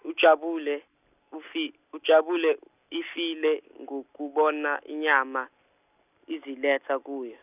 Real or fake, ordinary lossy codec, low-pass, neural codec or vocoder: real; none; 3.6 kHz; none